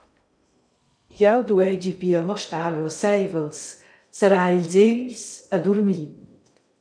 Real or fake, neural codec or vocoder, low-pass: fake; codec, 16 kHz in and 24 kHz out, 0.6 kbps, FocalCodec, streaming, 2048 codes; 9.9 kHz